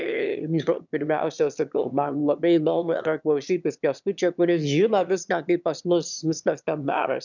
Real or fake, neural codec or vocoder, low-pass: fake; autoencoder, 22.05 kHz, a latent of 192 numbers a frame, VITS, trained on one speaker; 7.2 kHz